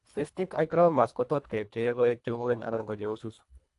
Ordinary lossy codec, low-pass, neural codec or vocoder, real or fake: AAC, 64 kbps; 10.8 kHz; codec, 24 kHz, 1.5 kbps, HILCodec; fake